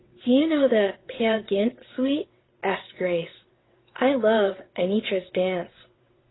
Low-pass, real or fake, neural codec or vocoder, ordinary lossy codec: 7.2 kHz; fake; vocoder, 22.05 kHz, 80 mel bands, WaveNeXt; AAC, 16 kbps